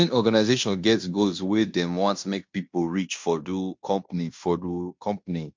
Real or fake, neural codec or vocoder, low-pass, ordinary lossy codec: fake; codec, 16 kHz in and 24 kHz out, 0.9 kbps, LongCat-Audio-Codec, fine tuned four codebook decoder; 7.2 kHz; MP3, 48 kbps